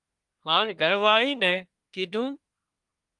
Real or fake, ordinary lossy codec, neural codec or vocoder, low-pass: fake; Opus, 32 kbps; codec, 24 kHz, 1 kbps, SNAC; 10.8 kHz